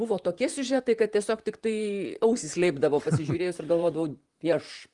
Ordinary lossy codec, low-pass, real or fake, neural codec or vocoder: Opus, 32 kbps; 10.8 kHz; fake; vocoder, 44.1 kHz, 128 mel bands, Pupu-Vocoder